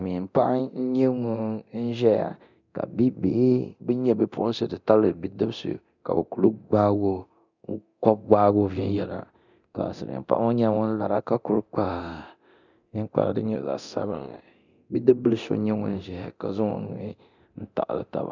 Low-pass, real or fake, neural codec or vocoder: 7.2 kHz; fake; codec, 24 kHz, 0.9 kbps, DualCodec